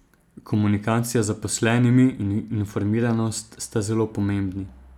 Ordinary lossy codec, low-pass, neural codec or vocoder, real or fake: none; 19.8 kHz; none; real